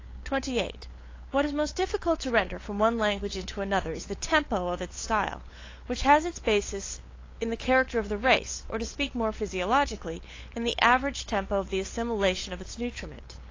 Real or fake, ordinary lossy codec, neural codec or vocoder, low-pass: fake; AAC, 32 kbps; codec, 16 kHz, 8 kbps, FunCodec, trained on LibriTTS, 25 frames a second; 7.2 kHz